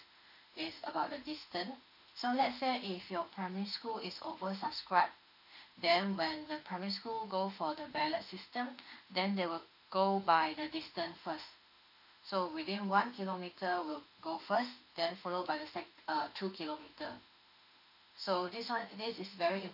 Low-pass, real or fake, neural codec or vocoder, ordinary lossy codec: 5.4 kHz; fake; autoencoder, 48 kHz, 32 numbers a frame, DAC-VAE, trained on Japanese speech; none